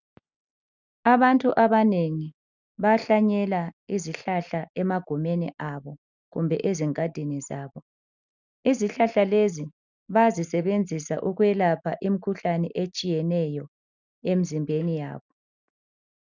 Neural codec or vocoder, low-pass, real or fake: none; 7.2 kHz; real